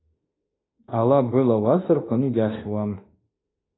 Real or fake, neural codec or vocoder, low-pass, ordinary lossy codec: fake; autoencoder, 48 kHz, 32 numbers a frame, DAC-VAE, trained on Japanese speech; 7.2 kHz; AAC, 16 kbps